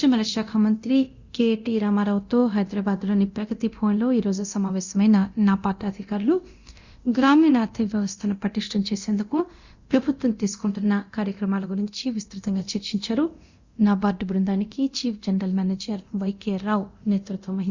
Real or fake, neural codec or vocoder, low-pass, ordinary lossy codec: fake; codec, 24 kHz, 0.9 kbps, DualCodec; 7.2 kHz; Opus, 64 kbps